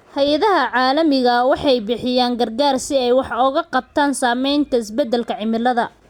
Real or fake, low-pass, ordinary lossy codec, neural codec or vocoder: real; 19.8 kHz; Opus, 64 kbps; none